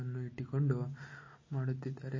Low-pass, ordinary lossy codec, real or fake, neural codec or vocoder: 7.2 kHz; MP3, 32 kbps; real; none